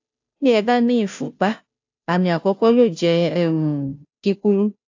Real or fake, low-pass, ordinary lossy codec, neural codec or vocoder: fake; 7.2 kHz; AAC, 48 kbps; codec, 16 kHz, 0.5 kbps, FunCodec, trained on Chinese and English, 25 frames a second